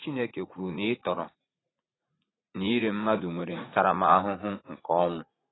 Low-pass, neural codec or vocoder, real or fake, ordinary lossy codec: 7.2 kHz; vocoder, 44.1 kHz, 80 mel bands, Vocos; fake; AAC, 16 kbps